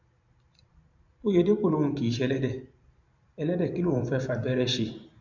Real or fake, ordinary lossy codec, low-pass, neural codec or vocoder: fake; none; 7.2 kHz; vocoder, 44.1 kHz, 128 mel bands every 512 samples, BigVGAN v2